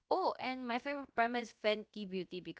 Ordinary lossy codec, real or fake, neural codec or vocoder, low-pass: none; fake; codec, 16 kHz, 0.7 kbps, FocalCodec; none